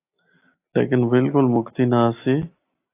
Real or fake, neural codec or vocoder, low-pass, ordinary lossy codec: real; none; 3.6 kHz; AAC, 24 kbps